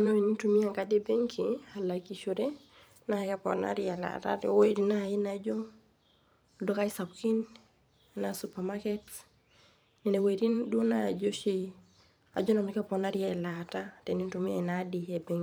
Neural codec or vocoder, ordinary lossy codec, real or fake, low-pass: vocoder, 44.1 kHz, 128 mel bands every 512 samples, BigVGAN v2; none; fake; none